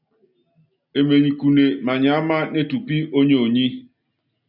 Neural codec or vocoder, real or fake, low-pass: none; real; 5.4 kHz